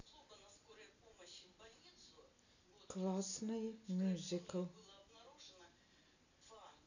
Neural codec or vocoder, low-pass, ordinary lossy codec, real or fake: none; 7.2 kHz; none; real